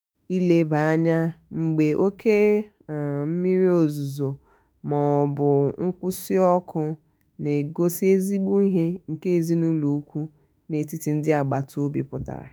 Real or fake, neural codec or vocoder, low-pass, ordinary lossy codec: fake; autoencoder, 48 kHz, 32 numbers a frame, DAC-VAE, trained on Japanese speech; none; none